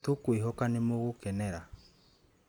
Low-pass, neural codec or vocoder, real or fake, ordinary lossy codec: none; none; real; none